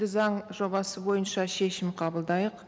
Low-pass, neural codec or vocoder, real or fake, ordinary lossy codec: none; none; real; none